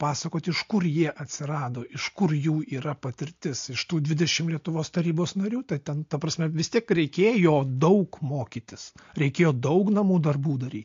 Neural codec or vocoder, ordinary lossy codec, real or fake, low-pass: none; MP3, 48 kbps; real; 7.2 kHz